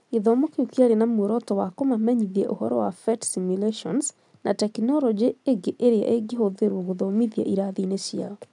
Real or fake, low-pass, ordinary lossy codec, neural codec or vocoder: real; 10.8 kHz; none; none